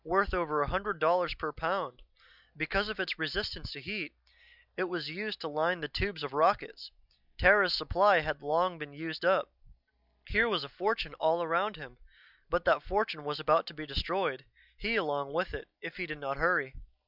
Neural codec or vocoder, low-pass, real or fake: none; 5.4 kHz; real